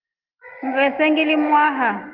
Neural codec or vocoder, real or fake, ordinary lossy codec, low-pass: none; real; Opus, 32 kbps; 5.4 kHz